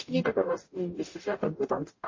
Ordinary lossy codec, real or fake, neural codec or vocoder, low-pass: MP3, 32 kbps; fake; codec, 44.1 kHz, 0.9 kbps, DAC; 7.2 kHz